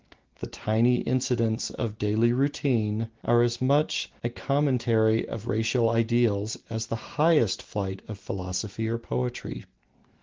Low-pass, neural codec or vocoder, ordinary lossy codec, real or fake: 7.2 kHz; none; Opus, 16 kbps; real